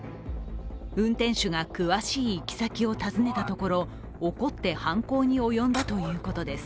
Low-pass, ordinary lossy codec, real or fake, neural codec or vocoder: none; none; real; none